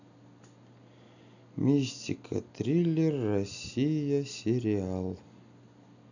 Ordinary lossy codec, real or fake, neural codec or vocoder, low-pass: none; real; none; 7.2 kHz